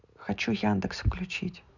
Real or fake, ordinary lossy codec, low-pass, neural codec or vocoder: real; none; 7.2 kHz; none